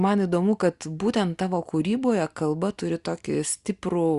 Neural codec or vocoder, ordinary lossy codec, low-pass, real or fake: none; Opus, 64 kbps; 10.8 kHz; real